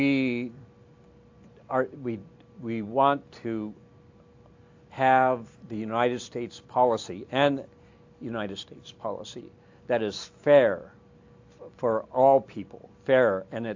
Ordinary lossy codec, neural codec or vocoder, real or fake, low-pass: AAC, 48 kbps; none; real; 7.2 kHz